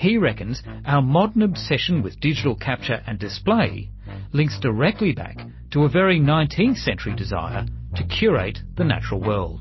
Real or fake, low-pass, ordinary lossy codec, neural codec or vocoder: real; 7.2 kHz; MP3, 24 kbps; none